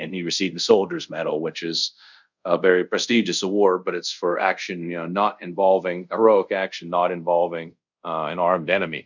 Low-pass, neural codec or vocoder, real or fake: 7.2 kHz; codec, 24 kHz, 0.5 kbps, DualCodec; fake